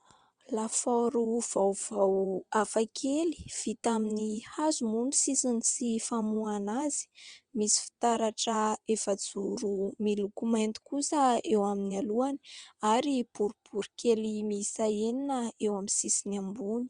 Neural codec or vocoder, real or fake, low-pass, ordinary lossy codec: vocoder, 22.05 kHz, 80 mel bands, WaveNeXt; fake; 9.9 kHz; Opus, 64 kbps